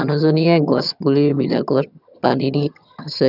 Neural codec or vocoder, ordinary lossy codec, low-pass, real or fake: vocoder, 22.05 kHz, 80 mel bands, HiFi-GAN; none; 5.4 kHz; fake